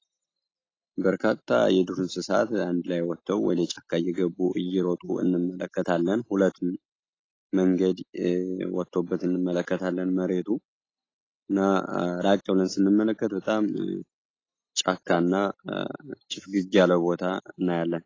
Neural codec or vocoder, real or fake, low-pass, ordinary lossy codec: none; real; 7.2 kHz; AAC, 32 kbps